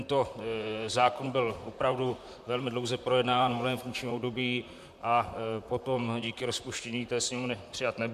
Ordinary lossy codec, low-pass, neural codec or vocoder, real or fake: MP3, 96 kbps; 14.4 kHz; vocoder, 44.1 kHz, 128 mel bands, Pupu-Vocoder; fake